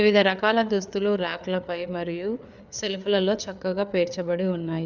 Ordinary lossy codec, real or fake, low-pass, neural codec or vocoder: none; fake; 7.2 kHz; codec, 16 kHz, 4 kbps, FreqCodec, larger model